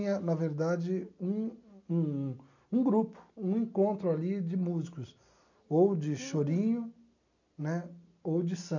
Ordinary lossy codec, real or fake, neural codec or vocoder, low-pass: none; real; none; 7.2 kHz